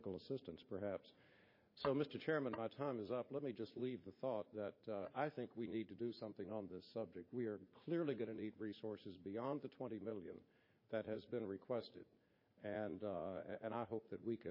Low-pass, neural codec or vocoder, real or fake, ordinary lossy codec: 5.4 kHz; vocoder, 44.1 kHz, 80 mel bands, Vocos; fake; MP3, 24 kbps